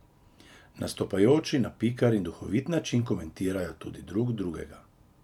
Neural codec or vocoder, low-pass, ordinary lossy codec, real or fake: vocoder, 44.1 kHz, 128 mel bands every 512 samples, BigVGAN v2; 19.8 kHz; none; fake